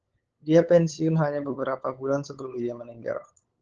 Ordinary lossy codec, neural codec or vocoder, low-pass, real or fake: Opus, 16 kbps; codec, 16 kHz, 8 kbps, FunCodec, trained on LibriTTS, 25 frames a second; 7.2 kHz; fake